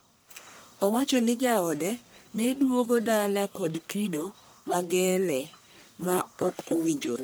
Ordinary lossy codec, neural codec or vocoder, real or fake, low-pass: none; codec, 44.1 kHz, 1.7 kbps, Pupu-Codec; fake; none